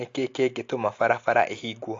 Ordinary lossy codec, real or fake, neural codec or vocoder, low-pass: AAC, 48 kbps; real; none; 7.2 kHz